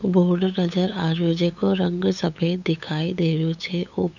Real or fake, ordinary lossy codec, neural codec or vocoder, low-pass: fake; none; codec, 16 kHz, 8 kbps, FunCodec, trained on LibriTTS, 25 frames a second; 7.2 kHz